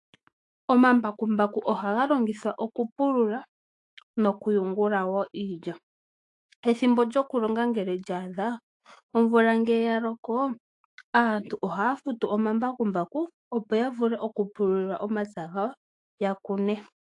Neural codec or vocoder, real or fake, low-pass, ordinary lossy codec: autoencoder, 48 kHz, 128 numbers a frame, DAC-VAE, trained on Japanese speech; fake; 10.8 kHz; AAC, 48 kbps